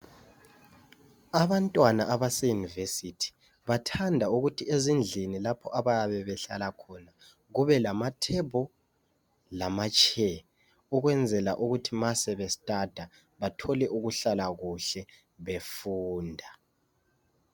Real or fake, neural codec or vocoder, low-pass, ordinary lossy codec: real; none; 19.8 kHz; MP3, 96 kbps